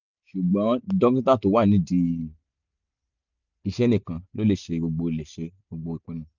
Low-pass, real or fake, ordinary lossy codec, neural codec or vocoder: 7.2 kHz; real; none; none